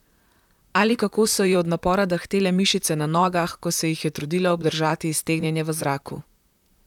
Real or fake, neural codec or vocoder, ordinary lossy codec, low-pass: fake; vocoder, 44.1 kHz, 128 mel bands, Pupu-Vocoder; none; 19.8 kHz